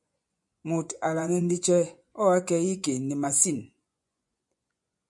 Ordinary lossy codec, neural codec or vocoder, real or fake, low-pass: MP3, 96 kbps; vocoder, 24 kHz, 100 mel bands, Vocos; fake; 10.8 kHz